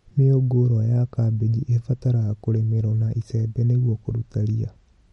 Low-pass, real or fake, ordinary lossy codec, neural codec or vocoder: 19.8 kHz; real; MP3, 48 kbps; none